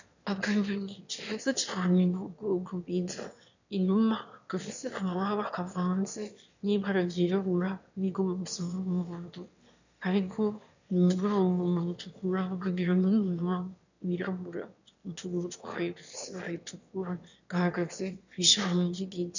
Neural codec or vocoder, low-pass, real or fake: autoencoder, 22.05 kHz, a latent of 192 numbers a frame, VITS, trained on one speaker; 7.2 kHz; fake